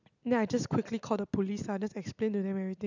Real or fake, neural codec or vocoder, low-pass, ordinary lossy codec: real; none; 7.2 kHz; none